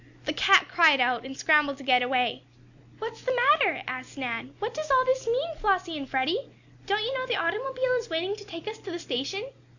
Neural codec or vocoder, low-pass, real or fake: none; 7.2 kHz; real